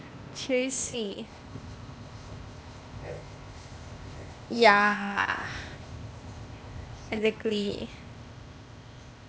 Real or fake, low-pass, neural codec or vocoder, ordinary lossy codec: fake; none; codec, 16 kHz, 0.8 kbps, ZipCodec; none